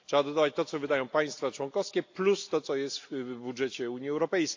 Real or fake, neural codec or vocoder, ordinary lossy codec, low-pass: real; none; AAC, 48 kbps; 7.2 kHz